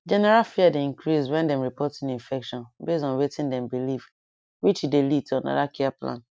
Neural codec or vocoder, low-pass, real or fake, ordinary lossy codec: none; none; real; none